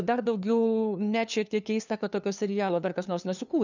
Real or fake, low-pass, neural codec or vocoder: fake; 7.2 kHz; codec, 16 kHz, 2 kbps, FunCodec, trained on LibriTTS, 25 frames a second